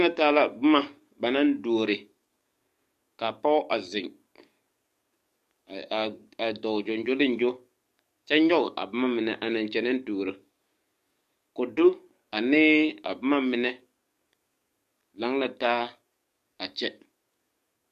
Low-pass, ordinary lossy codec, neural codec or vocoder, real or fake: 14.4 kHz; MP3, 64 kbps; codec, 44.1 kHz, 7.8 kbps, DAC; fake